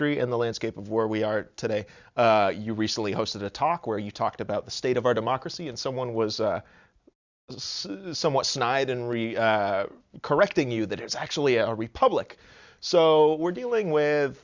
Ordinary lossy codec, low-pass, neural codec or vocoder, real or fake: Opus, 64 kbps; 7.2 kHz; none; real